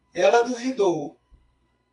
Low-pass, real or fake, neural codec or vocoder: 10.8 kHz; fake; codec, 32 kHz, 1.9 kbps, SNAC